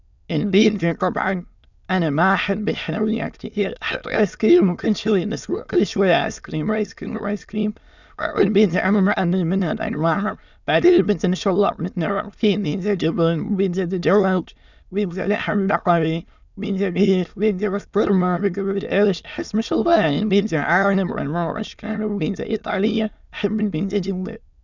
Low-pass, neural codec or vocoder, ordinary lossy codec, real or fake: 7.2 kHz; autoencoder, 22.05 kHz, a latent of 192 numbers a frame, VITS, trained on many speakers; none; fake